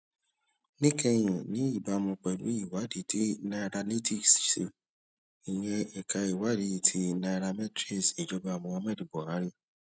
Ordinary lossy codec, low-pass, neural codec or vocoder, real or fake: none; none; none; real